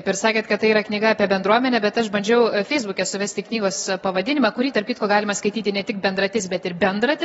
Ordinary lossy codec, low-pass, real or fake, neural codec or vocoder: AAC, 24 kbps; 19.8 kHz; real; none